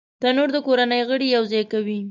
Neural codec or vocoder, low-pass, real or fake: none; 7.2 kHz; real